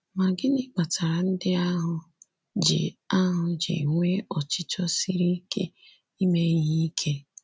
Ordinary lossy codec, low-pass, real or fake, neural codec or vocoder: none; none; real; none